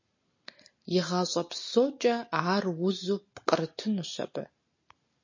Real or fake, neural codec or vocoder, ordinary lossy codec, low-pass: real; none; MP3, 32 kbps; 7.2 kHz